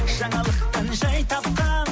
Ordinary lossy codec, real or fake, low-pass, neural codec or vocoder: none; real; none; none